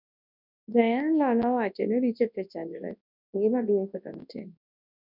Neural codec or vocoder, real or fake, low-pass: codec, 24 kHz, 0.9 kbps, WavTokenizer, large speech release; fake; 5.4 kHz